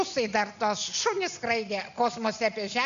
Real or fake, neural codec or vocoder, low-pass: real; none; 7.2 kHz